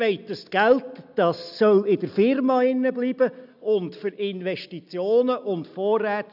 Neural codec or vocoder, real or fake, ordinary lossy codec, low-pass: none; real; none; 5.4 kHz